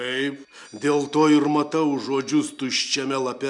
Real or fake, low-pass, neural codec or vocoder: real; 10.8 kHz; none